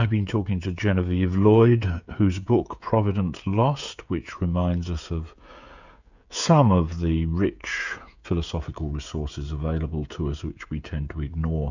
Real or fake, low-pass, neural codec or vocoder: fake; 7.2 kHz; codec, 16 kHz, 16 kbps, FreqCodec, smaller model